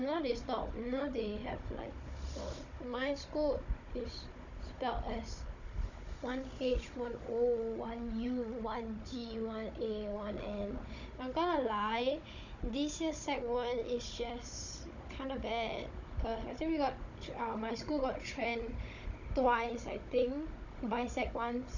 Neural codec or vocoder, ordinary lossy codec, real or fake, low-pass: codec, 16 kHz, 16 kbps, FunCodec, trained on Chinese and English, 50 frames a second; none; fake; 7.2 kHz